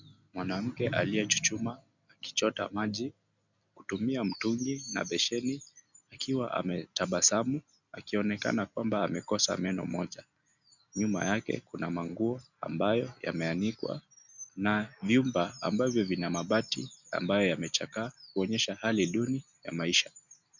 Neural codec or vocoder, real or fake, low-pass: none; real; 7.2 kHz